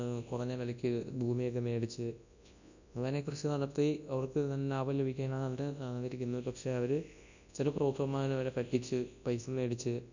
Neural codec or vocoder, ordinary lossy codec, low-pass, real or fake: codec, 24 kHz, 0.9 kbps, WavTokenizer, large speech release; none; 7.2 kHz; fake